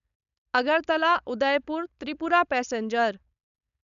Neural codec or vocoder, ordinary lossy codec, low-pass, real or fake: codec, 16 kHz, 4.8 kbps, FACodec; none; 7.2 kHz; fake